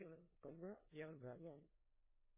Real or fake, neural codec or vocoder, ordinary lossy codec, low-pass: fake; codec, 16 kHz in and 24 kHz out, 0.4 kbps, LongCat-Audio-Codec, four codebook decoder; MP3, 16 kbps; 3.6 kHz